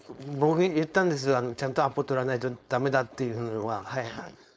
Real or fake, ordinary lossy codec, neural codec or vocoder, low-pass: fake; none; codec, 16 kHz, 4.8 kbps, FACodec; none